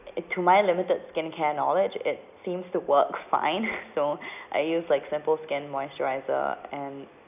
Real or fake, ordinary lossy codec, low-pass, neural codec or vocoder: real; none; 3.6 kHz; none